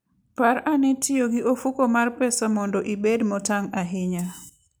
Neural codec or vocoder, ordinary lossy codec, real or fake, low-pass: none; none; real; none